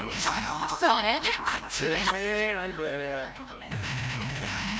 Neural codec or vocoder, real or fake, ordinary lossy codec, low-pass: codec, 16 kHz, 0.5 kbps, FreqCodec, larger model; fake; none; none